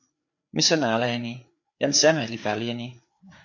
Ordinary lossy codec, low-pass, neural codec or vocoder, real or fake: AAC, 48 kbps; 7.2 kHz; codec, 16 kHz, 4 kbps, FreqCodec, larger model; fake